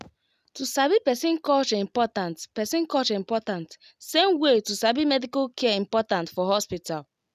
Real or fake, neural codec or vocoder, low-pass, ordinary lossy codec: real; none; 14.4 kHz; none